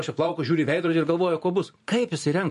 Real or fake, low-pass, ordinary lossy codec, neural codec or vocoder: fake; 14.4 kHz; MP3, 48 kbps; vocoder, 44.1 kHz, 128 mel bands every 512 samples, BigVGAN v2